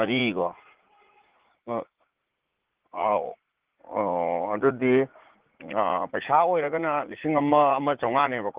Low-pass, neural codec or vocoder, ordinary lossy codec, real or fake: 3.6 kHz; vocoder, 44.1 kHz, 80 mel bands, Vocos; Opus, 16 kbps; fake